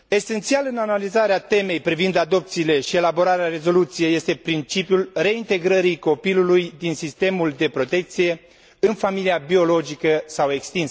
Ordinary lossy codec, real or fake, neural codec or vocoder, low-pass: none; real; none; none